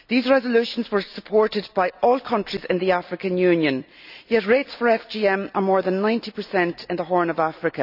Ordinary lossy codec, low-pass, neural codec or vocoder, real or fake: none; 5.4 kHz; none; real